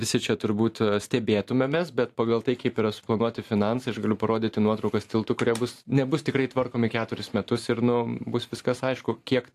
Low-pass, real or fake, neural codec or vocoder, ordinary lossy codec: 14.4 kHz; fake; vocoder, 44.1 kHz, 128 mel bands every 512 samples, BigVGAN v2; AAC, 64 kbps